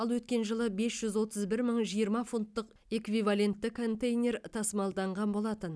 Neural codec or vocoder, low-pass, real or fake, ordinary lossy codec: none; none; real; none